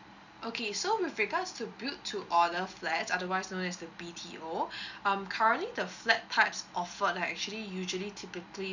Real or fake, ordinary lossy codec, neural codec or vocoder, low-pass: real; none; none; 7.2 kHz